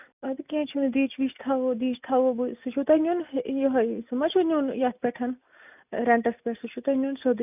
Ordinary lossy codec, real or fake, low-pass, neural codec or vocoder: none; real; 3.6 kHz; none